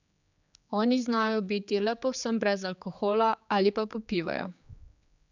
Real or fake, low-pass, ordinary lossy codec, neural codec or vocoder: fake; 7.2 kHz; none; codec, 16 kHz, 4 kbps, X-Codec, HuBERT features, trained on general audio